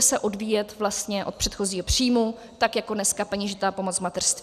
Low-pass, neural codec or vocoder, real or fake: 14.4 kHz; none; real